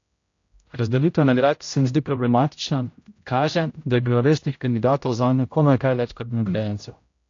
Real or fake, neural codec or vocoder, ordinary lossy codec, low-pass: fake; codec, 16 kHz, 0.5 kbps, X-Codec, HuBERT features, trained on general audio; AAC, 48 kbps; 7.2 kHz